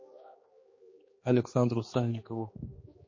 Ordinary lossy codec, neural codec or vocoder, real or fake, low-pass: MP3, 32 kbps; codec, 16 kHz, 2 kbps, X-Codec, HuBERT features, trained on general audio; fake; 7.2 kHz